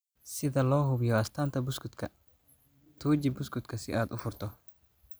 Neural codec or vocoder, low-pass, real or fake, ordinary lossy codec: none; none; real; none